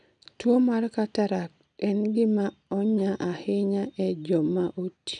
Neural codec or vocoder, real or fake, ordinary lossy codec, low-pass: none; real; none; 9.9 kHz